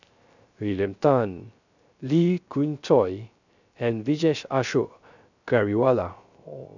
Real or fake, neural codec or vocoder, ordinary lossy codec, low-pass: fake; codec, 16 kHz, 0.3 kbps, FocalCodec; none; 7.2 kHz